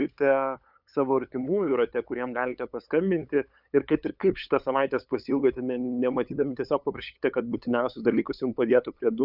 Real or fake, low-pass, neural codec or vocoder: fake; 5.4 kHz; codec, 16 kHz, 8 kbps, FunCodec, trained on LibriTTS, 25 frames a second